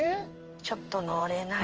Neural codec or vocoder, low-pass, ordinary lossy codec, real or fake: codec, 16 kHz in and 24 kHz out, 1 kbps, XY-Tokenizer; 7.2 kHz; Opus, 24 kbps; fake